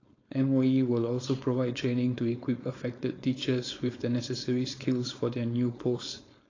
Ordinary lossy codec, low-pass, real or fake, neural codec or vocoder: AAC, 32 kbps; 7.2 kHz; fake; codec, 16 kHz, 4.8 kbps, FACodec